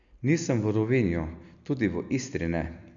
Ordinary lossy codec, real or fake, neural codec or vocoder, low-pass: none; real; none; 7.2 kHz